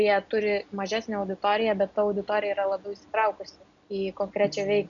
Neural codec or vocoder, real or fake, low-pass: none; real; 7.2 kHz